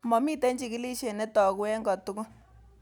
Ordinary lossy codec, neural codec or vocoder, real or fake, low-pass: none; none; real; none